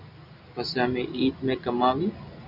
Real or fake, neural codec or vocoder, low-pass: real; none; 5.4 kHz